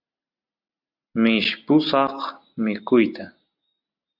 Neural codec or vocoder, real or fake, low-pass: none; real; 5.4 kHz